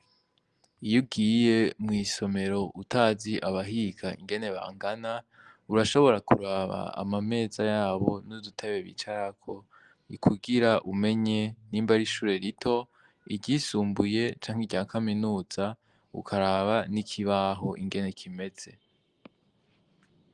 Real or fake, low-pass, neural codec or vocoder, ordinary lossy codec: real; 10.8 kHz; none; Opus, 24 kbps